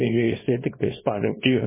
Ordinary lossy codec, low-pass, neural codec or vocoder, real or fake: MP3, 16 kbps; 3.6 kHz; codec, 24 kHz, 0.9 kbps, WavTokenizer, small release; fake